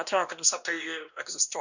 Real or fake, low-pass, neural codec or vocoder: fake; 7.2 kHz; codec, 16 kHz, 1 kbps, X-Codec, WavLM features, trained on Multilingual LibriSpeech